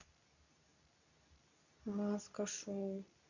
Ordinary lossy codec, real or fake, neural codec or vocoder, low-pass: none; fake; codec, 24 kHz, 0.9 kbps, WavTokenizer, medium speech release version 2; 7.2 kHz